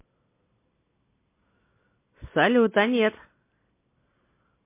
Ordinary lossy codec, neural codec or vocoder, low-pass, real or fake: MP3, 24 kbps; none; 3.6 kHz; real